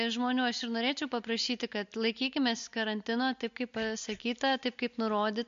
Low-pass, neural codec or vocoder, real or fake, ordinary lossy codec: 7.2 kHz; none; real; MP3, 48 kbps